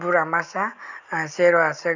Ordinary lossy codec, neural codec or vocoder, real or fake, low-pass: none; none; real; 7.2 kHz